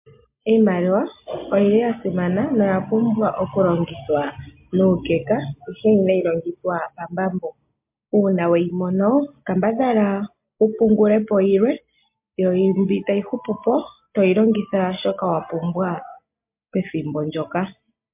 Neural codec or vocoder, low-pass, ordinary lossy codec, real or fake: none; 3.6 kHz; MP3, 32 kbps; real